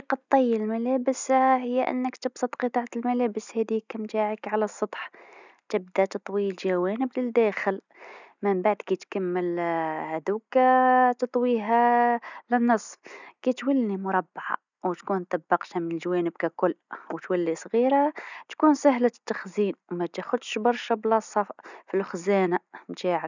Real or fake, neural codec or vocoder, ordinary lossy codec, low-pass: real; none; none; 7.2 kHz